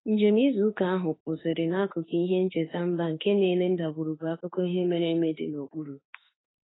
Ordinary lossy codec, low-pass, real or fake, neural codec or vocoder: AAC, 16 kbps; 7.2 kHz; fake; autoencoder, 48 kHz, 32 numbers a frame, DAC-VAE, trained on Japanese speech